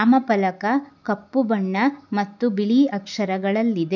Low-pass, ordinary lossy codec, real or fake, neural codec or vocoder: 7.2 kHz; none; real; none